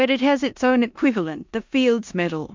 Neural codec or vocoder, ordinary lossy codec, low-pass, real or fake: codec, 16 kHz in and 24 kHz out, 0.9 kbps, LongCat-Audio-Codec, four codebook decoder; MP3, 64 kbps; 7.2 kHz; fake